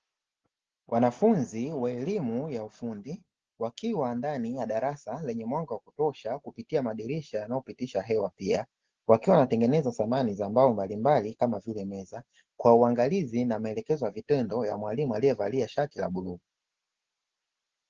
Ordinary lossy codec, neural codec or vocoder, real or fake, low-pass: Opus, 16 kbps; none; real; 7.2 kHz